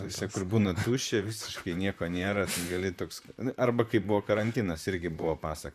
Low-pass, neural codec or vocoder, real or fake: 14.4 kHz; vocoder, 44.1 kHz, 128 mel bands, Pupu-Vocoder; fake